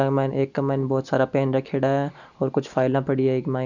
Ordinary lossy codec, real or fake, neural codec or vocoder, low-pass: none; real; none; 7.2 kHz